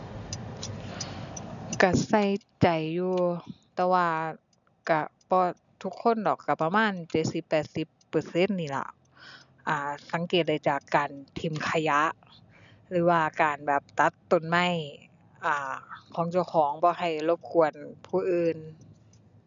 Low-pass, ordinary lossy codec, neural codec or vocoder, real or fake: 7.2 kHz; none; none; real